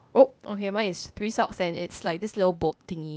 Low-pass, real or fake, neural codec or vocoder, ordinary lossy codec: none; fake; codec, 16 kHz, 0.8 kbps, ZipCodec; none